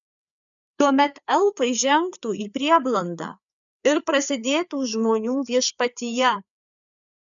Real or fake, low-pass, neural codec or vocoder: fake; 7.2 kHz; codec, 16 kHz, 4 kbps, FreqCodec, larger model